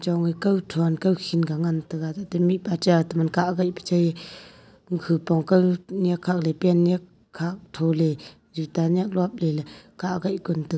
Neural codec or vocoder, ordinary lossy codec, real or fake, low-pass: none; none; real; none